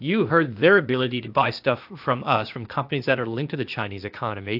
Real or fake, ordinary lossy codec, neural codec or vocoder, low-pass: fake; AAC, 48 kbps; codec, 16 kHz, 0.8 kbps, ZipCodec; 5.4 kHz